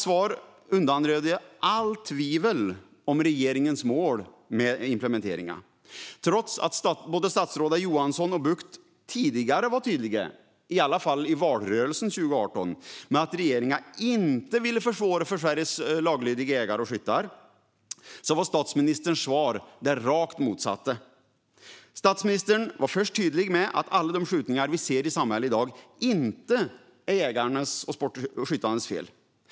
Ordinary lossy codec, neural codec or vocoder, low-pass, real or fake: none; none; none; real